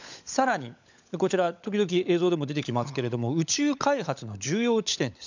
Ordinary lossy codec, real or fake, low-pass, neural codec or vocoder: none; fake; 7.2 kHz; codec, 16 kHz, 16 kbps, FunCodec, trained on LibriTTS, 50 frames a second